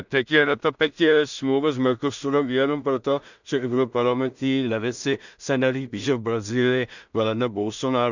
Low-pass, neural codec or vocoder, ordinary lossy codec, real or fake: 7.2 kHz; codec, 16 kHz in and 24 kHz out, 0.4 kbps, LongCat-Audio-Codec, two codebook decoder; none; fake